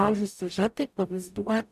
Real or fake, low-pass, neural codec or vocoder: fake; 14.4 kHz; codec, 44.1 kHz, 0.9 kbps, DAC